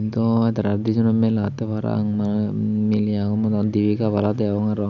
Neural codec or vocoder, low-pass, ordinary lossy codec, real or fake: none; 7.2 kHz; none; real